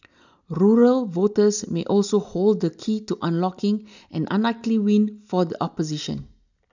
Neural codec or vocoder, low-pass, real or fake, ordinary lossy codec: none; 7.2 kHz; real; none